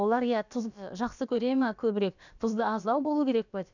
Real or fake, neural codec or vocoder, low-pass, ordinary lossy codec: fake; codec, 16 kHz, about 1 kbps, DyCAST, with the encoder's durations; 7.2 kHz; none